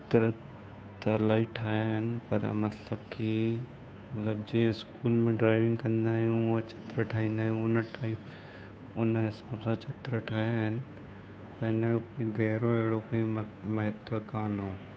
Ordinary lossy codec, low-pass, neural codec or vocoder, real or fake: none; none; codec, 16 kHz, 2 kbps, FunCodec, trained on Chinese and English, 25 frames a second; fake